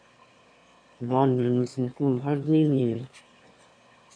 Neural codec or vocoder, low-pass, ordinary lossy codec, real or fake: autoencoder, 22.05 kHz, a latent of 192 numbers a frame, VITS, trained on one speaker; 9.9 kHz; MP3, 48 kbps; fake